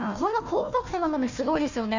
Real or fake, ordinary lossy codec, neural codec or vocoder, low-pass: fake; Opus, 64 kbps; codec, 16 kHz, 1 kbps, FunCodec, trained on Chinese and English, 50 frames a second; 7.2 kHz